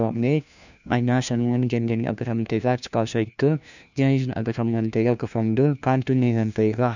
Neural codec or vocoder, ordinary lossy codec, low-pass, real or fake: codec, 16 kHz, 1 kbps, FunCodec, trained on LibriTTS, 50 frames a second; none; 7.2 kHz; fake